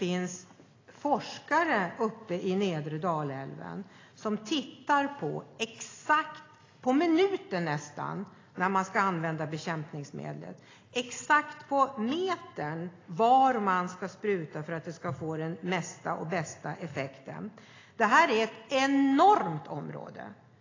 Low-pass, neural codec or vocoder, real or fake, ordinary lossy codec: 7.2 kHz; none; real; AAC, 32 kbps